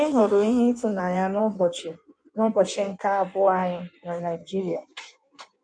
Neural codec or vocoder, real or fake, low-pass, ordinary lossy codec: codec, 16 kHz in and 24 kHz out, 1.1 kbps, FireRedTTS-2 codec; fake; 9.9 kHz; none